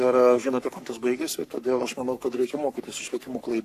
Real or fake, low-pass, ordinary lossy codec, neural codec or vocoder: fake; 14.4 kHz; AAC, 64 kbps; codec, 44.1 kHz, 3.4 kbps, Pupu-Codec